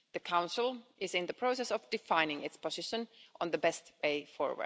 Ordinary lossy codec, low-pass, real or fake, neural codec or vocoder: none; none; real; none